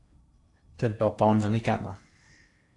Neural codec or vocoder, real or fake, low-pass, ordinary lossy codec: codec, 16 kHz in and 24 kHz out, 0.8 kbps, FocalCodec, streaming, 65536 codes; fake; 10.8 kHz; AAC, 48 kbps